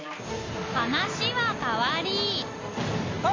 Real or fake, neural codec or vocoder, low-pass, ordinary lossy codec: real; none; 7.2 kHz; AAC, 32 kbps